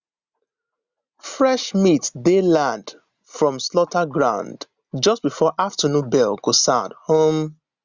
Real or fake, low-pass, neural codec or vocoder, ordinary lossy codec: real; 7.2 kHz; none; Opus, 64 kbps